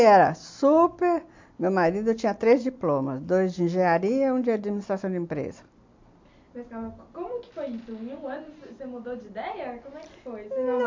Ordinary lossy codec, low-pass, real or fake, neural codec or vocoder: MP3, 48 kbps; 7.2 kHz; real; none